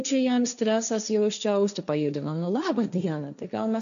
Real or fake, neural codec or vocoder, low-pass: fake; codec, 16 kHz, 1.1 kbps, Voila-Tokenizer; 7.2 kHz